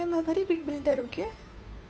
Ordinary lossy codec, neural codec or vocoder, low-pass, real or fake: none; codec, 16 kHz, 2 kbps, FunCodec, trained on Chinese and English, 25 frames a second; none; fake